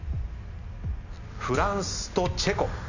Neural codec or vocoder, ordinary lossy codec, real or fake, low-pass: none; none; real; 7.2 kHz